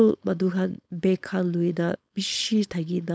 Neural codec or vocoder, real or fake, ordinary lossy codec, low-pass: codec, 16 kHz, 4.8 kbps, FACodec; fake; none; none